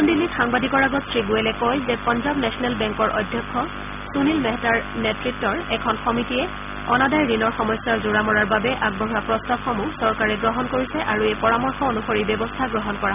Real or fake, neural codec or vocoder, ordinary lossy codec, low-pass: real; none; none; 3.6 kHz